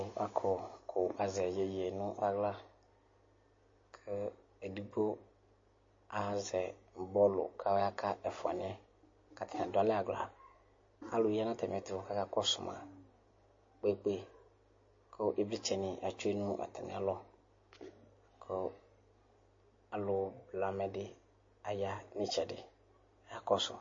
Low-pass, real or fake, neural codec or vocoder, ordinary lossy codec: 7.2 kHz; real; none; MP3, 32 kbps